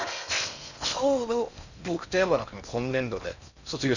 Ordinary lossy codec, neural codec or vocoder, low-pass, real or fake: none; codec, 16 kHz in and 24 kHz out, 0.6 kbps, FocalCodec, streaming, 4096 codes; 7.2 kHz; fake